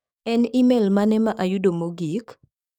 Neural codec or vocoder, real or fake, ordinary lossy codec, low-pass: codec, 44.1 kHz, 7.8 kbps, DAC; fake; none; 19.8 kHz